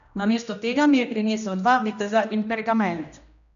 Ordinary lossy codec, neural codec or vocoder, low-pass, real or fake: none; codec, 16 kHz, 1 kbps, X-Codec, HuBERT features, trained on general audio; 7.2 kHz; fake